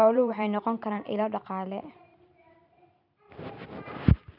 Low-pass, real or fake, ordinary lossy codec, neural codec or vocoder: 5.4 kHz; fake; none; vocoder, 44.1 kHz, 128 mel bands every 512 samples, BigVGAN v2